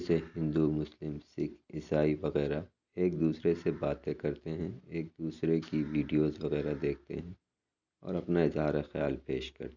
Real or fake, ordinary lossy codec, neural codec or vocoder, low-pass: real; none; none; 7.2 kHz